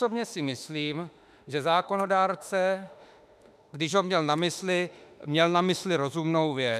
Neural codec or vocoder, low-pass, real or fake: autoencoder, 48 kHz, 32 numbers a frame, DAC-VAE, trained on Japanese speech; 14.4 kHz; fake